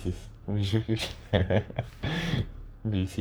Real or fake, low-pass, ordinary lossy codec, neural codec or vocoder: fake; none; none; codec, 44.1 kHz, 3.4 kbps, Pupu-Codec